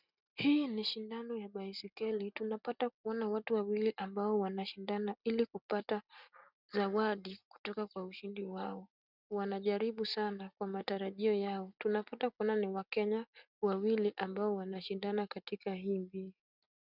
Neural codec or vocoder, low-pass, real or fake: none; 5.4 kHz; real